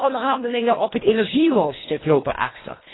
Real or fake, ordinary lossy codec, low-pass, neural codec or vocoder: fake; AAC, 16 kbps; 7.2 kHz; codec, 24 kHz, 1.5 kbps, HILCodec